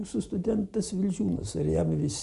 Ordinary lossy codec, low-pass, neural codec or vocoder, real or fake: Opus, 64 kbps; 10.8 kHz; none; real